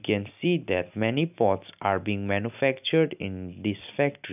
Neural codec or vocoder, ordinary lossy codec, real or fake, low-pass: none; none; real; 3.6 kHz